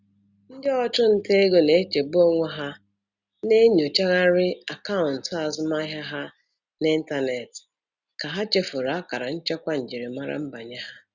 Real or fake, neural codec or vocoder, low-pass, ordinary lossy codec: real; none; 7.2 kHz; Opus, 64 kbps